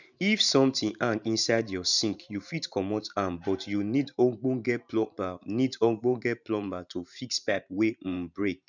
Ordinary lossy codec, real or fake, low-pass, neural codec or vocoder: none; fake; 7.2 kHz; vocoder, 44.1 kHz, 128 mel bands every 512 samples, BigVGAN v2